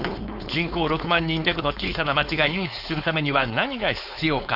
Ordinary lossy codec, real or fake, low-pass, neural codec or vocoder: none; fake; 5.4 kHz; codec, 16 kHz, 4.8 kbps, FACodec